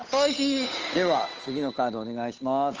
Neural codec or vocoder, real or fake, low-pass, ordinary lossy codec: codec, 16 kHz, 8 kbps, FunCodec, trained on Chinese and English, 25 frames a second; fake; 7.2 kHz; Opus, 24 kbps